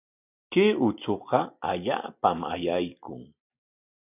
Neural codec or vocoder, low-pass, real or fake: none; 3.6 kHz; real